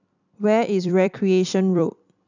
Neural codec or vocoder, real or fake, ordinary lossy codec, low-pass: vocoder, 44.1 kHz, 128 mel bands every 256 samples, BigVGAN v2; fake; none; 7.2 kHz